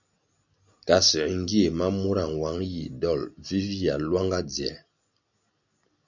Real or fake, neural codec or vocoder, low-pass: real; none; 7.2 kHz